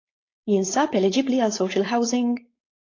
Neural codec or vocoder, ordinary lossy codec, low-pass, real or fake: codec, 16 kHz, 4.8 kbps, FACodec; AAC, 32 kbps; 7.2 kHz; fake